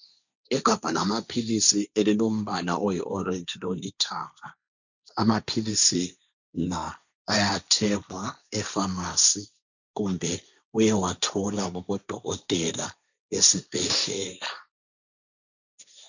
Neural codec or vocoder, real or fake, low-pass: codec, 16 kHz, 1.1 kbps, Voila-Tokenizer; fake; 7.2 kHz